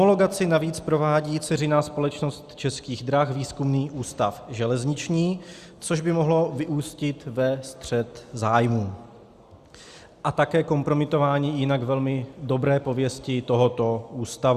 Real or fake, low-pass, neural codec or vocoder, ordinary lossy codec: real; 14.4 kHz; none; Opus, 64 kbps